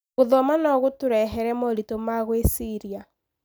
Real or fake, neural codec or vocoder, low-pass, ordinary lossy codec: real; none; none; none